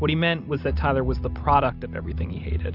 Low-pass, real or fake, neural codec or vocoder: 5.4 kHz; real; none